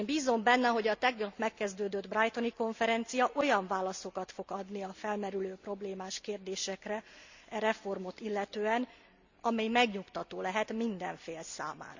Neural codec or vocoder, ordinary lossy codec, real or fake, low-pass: none; Opus, 64 kbps; real; 7.2 kHz